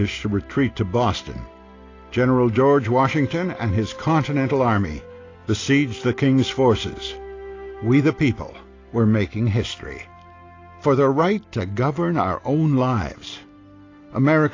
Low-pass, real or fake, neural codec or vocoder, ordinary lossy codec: 7.2 kHz; real; none; AAC, 32 kbps